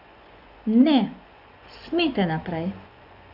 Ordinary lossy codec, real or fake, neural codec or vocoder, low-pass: none; fake; vocoder, 24 kHz, 100 mel bands, Vocos; 5.4 kHz